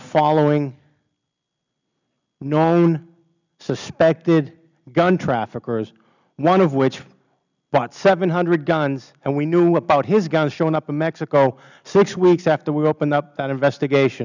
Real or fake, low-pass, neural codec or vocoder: real; 7.2 kHz; none